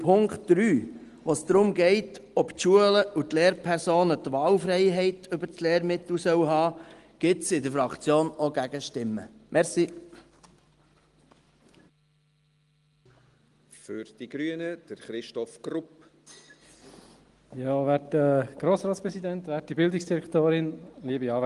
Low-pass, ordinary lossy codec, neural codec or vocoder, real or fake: 10.8 kHz; Opus, 24 kbps; none; real